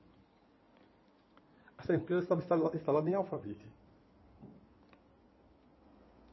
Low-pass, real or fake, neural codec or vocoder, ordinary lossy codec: 7.2 kHz; fake; codec, 16 kHz in and 24 kHz out, 2.2 kbps, FireRedTTS-2 codec; MP3, 24 kbps